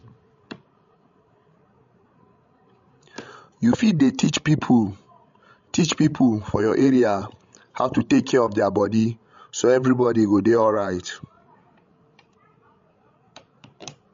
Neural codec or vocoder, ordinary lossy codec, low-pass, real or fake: codec, 16 kHz, 16 kbps, FreqCodec, larger model; MP3, 48 kbps; 7.2 kHz; fake